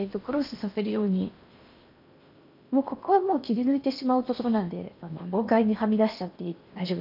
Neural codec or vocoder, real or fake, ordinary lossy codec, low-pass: codec, 16 kHz in and 24 kHz out, 0.8 kbps, FocalCodec, streaming, 65536 codes; fake; none; 5.4 kHz